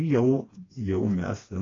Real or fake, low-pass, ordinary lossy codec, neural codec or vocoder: fake; 7.2 kHz; AAC, 32 kbps; codec, 16 kHz, 2 kbps, FreqCodec, smaller model